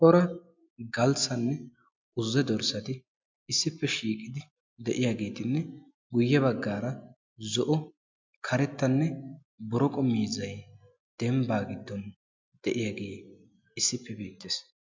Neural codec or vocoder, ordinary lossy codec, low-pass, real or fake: none; MP3, 64 kbps; 7.2 kHz; real